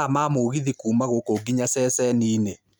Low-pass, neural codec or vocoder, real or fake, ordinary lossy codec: none; none; real; none